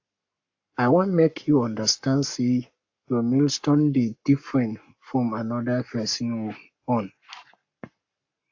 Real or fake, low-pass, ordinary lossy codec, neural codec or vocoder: fake; 7.2 kHz; AAC, 48 kbps; codec, 44.1 kHz, 7.8 kbps, Pupu-Codec